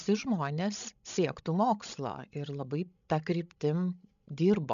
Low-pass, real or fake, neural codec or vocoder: 7.2 kHz; fake; codec, 16 kHz, 16 kbps, FreqCodec, larger model